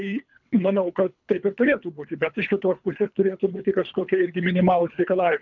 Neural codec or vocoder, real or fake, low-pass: codec, 24 kHz, 3 kbps, HILCodec; fake; 7.2 kHz